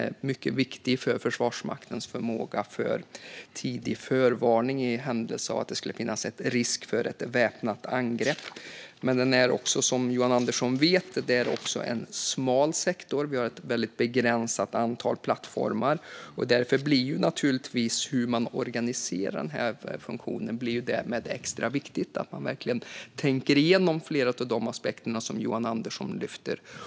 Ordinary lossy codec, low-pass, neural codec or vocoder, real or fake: none; none; none; real